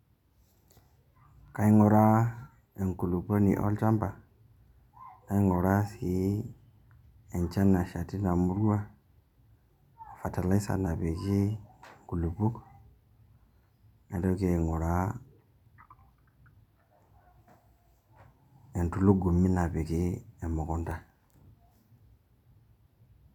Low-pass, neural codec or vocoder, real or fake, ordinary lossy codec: 19.8 kHz; none; real; none